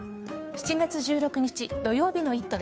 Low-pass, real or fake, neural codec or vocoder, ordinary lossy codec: none; fake; codec, 16 kHz, 2 kbps, FunCodec, trained on Chinese and English, 25 frames a second; none